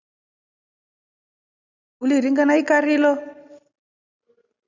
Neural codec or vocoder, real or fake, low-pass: none; real; 7.2 kHz